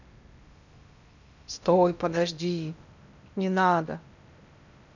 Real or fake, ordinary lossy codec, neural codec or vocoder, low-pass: fake; none; codec, 16 kHz in and 24 kHz out, 0.8 kbps, FocalCodec, streaming, 65536 codes; 7.2 kHz